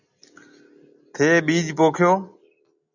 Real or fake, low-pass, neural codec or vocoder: real; 7.2 kHz; none